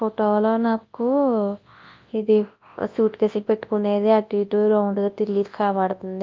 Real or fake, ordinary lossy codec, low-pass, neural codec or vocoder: fake; Opus, 32 kbps; 7.2 kHz; codec, 24 kHz, 0.9 kbps, WavTokenizer, large speech release